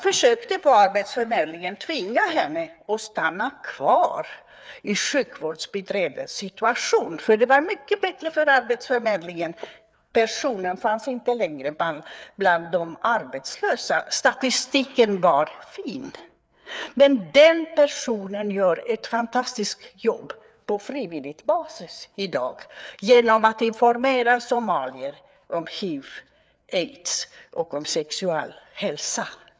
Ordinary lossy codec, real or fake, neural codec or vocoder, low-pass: none; fake; codec, 16 kHz, 4 kbps, FreqCodec, larger model; none